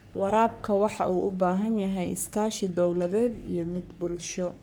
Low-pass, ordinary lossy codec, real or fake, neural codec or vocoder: none; none; fake; codec, 44.1 kHz, 3.4 kbps, Pupu-Codec